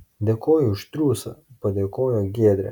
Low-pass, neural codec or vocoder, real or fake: 19.8 kHz; none; real